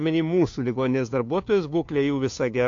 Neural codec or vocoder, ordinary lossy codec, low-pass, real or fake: codec, 16 kHz, 2 kbps, FunCodec, trained on Chinese and English, 25 frames a second; AAC, 48 kbps; 7.2 kHz; fake